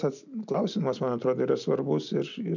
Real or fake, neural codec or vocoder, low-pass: real; none; 7.2 kHz